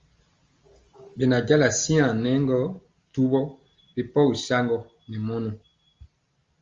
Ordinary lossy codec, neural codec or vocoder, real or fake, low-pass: Opus, 32 kbps; none; real; 7.2 kHz